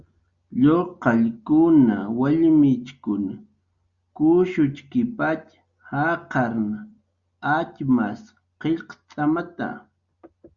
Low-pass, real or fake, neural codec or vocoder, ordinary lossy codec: 7.2 kHz; real; none; Opus, 64 kbps